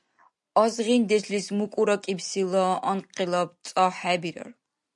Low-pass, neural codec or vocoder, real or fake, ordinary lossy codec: 10.8 kHz; none; real; MP3, 64 kbps